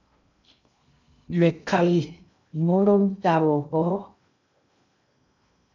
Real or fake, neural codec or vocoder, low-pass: fake; codec, 16 kHz in and 24 kHz out, 0.6 kbps, FocalCodec, streaming, 2048 codes; 7.2 kHz